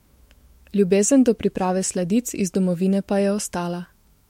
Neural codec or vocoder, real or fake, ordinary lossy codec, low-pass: autoencoder, 48 kHz, 128 numbers a frame, DAC-VAE, trained on Japanese speech; fake; MP3, 64 kbps; 19.8 kHz